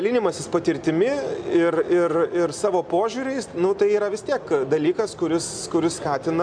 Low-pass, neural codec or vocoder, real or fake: 9.9 kHz; none; real